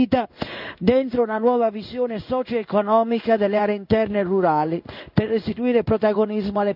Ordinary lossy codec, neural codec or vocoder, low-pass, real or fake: none; codec, 16 kHz in and 24 kHz out, 1 kbps, XY-Tokenizer; 5.4 kHz; fake